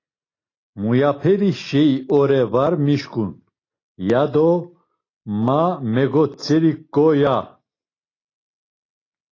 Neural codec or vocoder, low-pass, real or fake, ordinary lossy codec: none; 7.2 kHz; real; AAC, 32 kbps